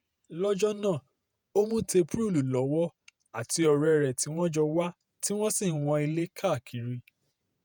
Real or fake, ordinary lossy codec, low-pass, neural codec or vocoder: fake; none; none; vocoder, 48 kHz, 128 mel bands, Vocos